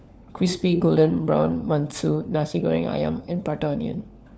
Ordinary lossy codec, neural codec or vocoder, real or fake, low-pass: none; codec, 16 kHz, 4 kbps, FunCodec, trained on LibriTTS, 50 frames a second; fake; none